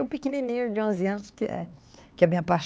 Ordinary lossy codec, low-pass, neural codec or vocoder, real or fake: none; none; codec, 16 kHz, 4 kbps, X-Codec, HuBERT features, trained on LibriSpeech; fake